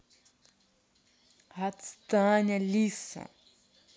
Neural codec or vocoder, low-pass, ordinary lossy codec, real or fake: none; none; none; real